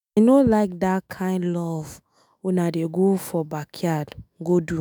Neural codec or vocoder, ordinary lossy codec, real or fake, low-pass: autoencoder, 48 kHz, 128 numbers a frame, DAC-VAE, trained on Japanese speech; none; fake; none